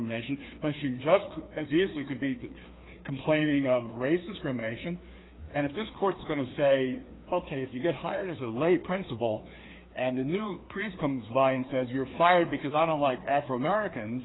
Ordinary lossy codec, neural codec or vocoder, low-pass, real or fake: AAC, 16 kbps; codec, 16 kHz, 2 kbps, FreqCodec, larger model; 7.2 kHz; fake